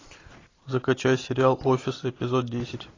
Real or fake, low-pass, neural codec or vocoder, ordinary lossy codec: real; 7.2 kHz; none; AAC, 32 kbps